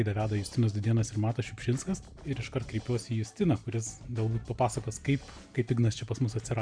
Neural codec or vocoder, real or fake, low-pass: none; real; 9.9 kHz